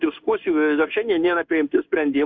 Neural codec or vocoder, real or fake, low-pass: codec, 16 kHz in and 24 kHz out, 1 kbps, XY-Tokenizer; fake; 7.2 kHz